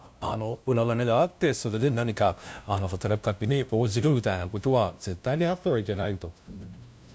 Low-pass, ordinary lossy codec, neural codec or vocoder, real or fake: none; none; codec, 16 kHz, 0.5 kbps, FunCodec, trained on LibriTTS, 25 frames a second; fake